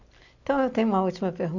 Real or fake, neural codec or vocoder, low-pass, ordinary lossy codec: real; none; 7.2 kHz; none